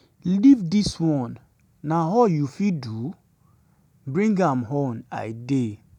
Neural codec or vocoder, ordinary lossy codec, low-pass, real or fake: none; none; 19.8 kHz; real